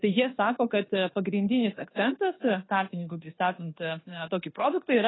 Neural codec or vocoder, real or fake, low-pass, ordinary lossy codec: codec, 24 kHz, 1.2 kbps, DualCodec; fake; 7.2 kHz; AAC, 16 kbps